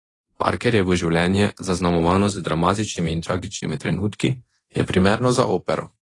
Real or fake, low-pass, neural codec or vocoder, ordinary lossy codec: fake; 10.8 kHz; codec, 24 kHz, 0.9 kbps, DualCodec; AAC, 32 kbps